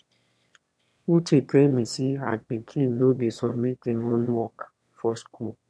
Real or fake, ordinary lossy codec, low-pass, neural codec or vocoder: fake; none; none; autoencoder, 22.05 kHz, a latent of 192 numbers a frame, VITS, trained on one speaker